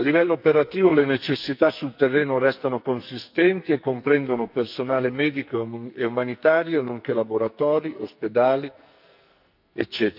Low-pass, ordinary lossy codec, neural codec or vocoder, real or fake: 5.4 kHz; none; codec, 44.1 kHz, 2.6 kbps, SNAC; fake